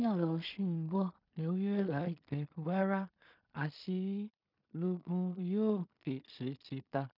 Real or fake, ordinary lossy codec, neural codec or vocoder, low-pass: fake; none; codec, 16 kHz in and 24 kHz out, 0.4 kbps, LongCat-Audio-Codec, two codebook decoder; 5.4 kHz